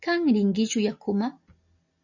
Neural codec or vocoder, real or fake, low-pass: none; real; 7.2 kHz